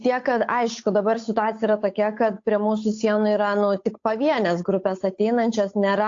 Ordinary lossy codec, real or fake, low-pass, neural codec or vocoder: AAC, 48 kbps; fake; 7.2 kHz; codec, 16 kHz, 8 kbps, FunCodec, trained on Chinese and English, 25 frames a second